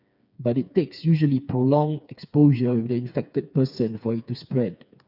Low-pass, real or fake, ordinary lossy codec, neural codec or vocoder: 5.4 kHz; fake; none; codec, 16 kHz, 4 kbps, FreqCodec, smaller model